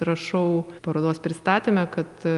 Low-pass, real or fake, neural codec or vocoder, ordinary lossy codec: 10.8 kHz; real; none; Opus, 32 kbps